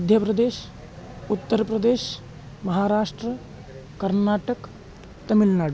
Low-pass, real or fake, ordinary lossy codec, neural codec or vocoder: none; real; none; none